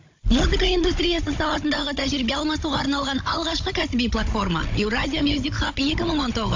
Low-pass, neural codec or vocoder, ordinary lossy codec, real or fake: 7.2 kHz; codec, 16 kHz, 16 kbps, FunCodec, trained on Chinese and English, 50 frames a second; AAC, 48 kbps; fake